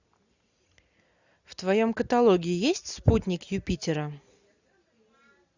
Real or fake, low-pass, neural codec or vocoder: real; 7.2 kHz; none